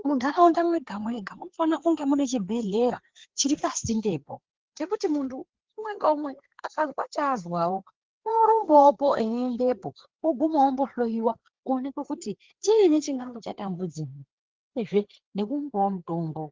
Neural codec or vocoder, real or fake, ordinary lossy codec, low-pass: codec, 16 kHz, 4 kbps, FreqCodec, larger model; fake; Opus, 16 kbps; 7.2 kHz